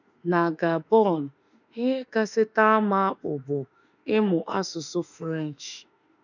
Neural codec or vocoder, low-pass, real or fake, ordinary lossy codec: autoencoder, 48 kHz, 32 numbers a frame, DAC-VAE, trained on Japanese speech; 7.2 kHz; fake; none